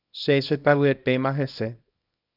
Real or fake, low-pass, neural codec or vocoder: fake; 5.4 kHz; codec, 24 kHz, 0.9 kbps, WavTokenizer, small release